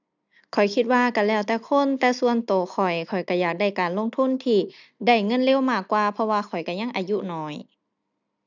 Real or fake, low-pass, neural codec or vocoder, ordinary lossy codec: real; 7.2 kHz; none; none